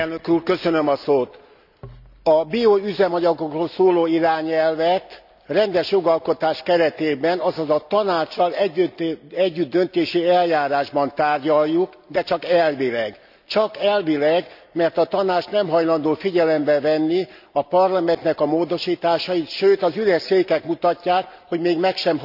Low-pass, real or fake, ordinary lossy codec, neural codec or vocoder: 5.4 kHz; real; none; none